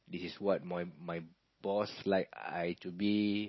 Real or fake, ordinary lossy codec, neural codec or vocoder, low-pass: real; MP3, 24 kbps; none; 7.2 kHz